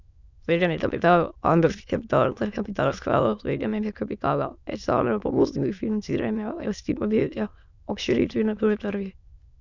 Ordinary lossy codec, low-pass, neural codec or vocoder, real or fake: none; 7.2 kHz; autoencoder, 22.05 kHz, a latent of 192 numbers a frame, VITS, trained on many speakers; fake